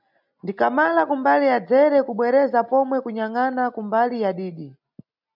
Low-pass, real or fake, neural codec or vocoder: 5.4 kHz; real; none